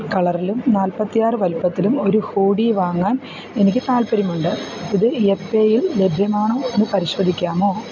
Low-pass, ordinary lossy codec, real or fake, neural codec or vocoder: 7.2 kHz; none; real; none